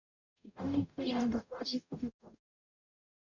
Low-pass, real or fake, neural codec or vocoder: 7.2 kHz; fake; codec, 44.1 kHz, 0.9 kbps, DAC